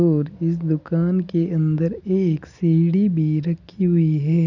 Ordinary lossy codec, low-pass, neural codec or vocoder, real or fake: none; 7.2 kHz; none; real